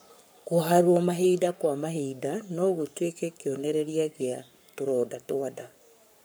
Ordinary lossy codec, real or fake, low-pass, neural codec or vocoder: none; fake; none; codec, 44.1 kHz, 7.8 kbps, Pupu-Codec